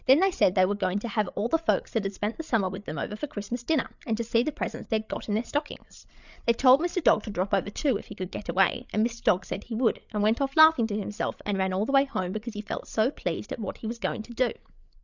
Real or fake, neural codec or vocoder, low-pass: fake; codec, 16 kHz, 8 kbps, FreqCodec, larger model; 7.2 kHz